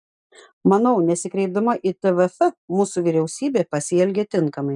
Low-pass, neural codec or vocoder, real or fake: 10.8 kHz; none; real